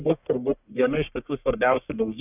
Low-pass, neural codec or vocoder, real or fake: 3.6 kHz; codec, 44.1 kHz, 1.7 kbps, Pupu-Codec; fake